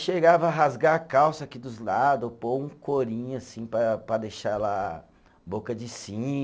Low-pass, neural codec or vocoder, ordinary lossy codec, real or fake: none; none; none; real